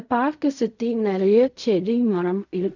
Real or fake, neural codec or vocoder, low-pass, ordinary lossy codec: fake; codec, 16 kHz in and 24 kHz out, 0.4 kbps, LongCat-Audio-Codec, fine tuned four codebook decoder; 7.2 kHz; none